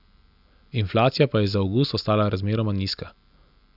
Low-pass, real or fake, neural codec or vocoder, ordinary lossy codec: 5.4 kHz; real; none; none